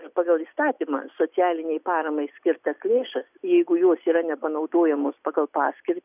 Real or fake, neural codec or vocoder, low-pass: real; none; 3.6 kHz